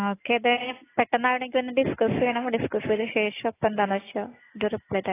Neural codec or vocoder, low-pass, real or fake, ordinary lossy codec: none; 3.6 kHz; real; AAC, 16 kbps